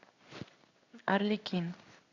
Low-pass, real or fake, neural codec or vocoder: 7.2 kHz; fake; codec, 16 kHz in and 24 kHz out, 1 kbps, XY-Tokenizer